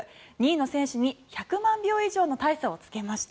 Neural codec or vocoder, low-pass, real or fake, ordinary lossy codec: none; none; real; none